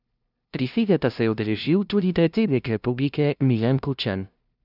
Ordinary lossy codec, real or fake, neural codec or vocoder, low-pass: none; fake; codec, 16 kHz, 0.5 kbps, FunCodec, trained on LibriTTS, 25 frames a second; 5.4 kHz